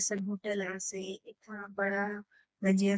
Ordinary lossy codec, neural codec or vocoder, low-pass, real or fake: none; codec, 16 kHz, 2 kbps, FreqCodec, smaller model; none; fake